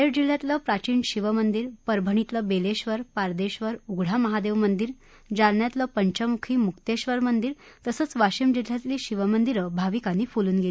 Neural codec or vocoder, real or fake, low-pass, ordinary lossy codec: none; real; none; none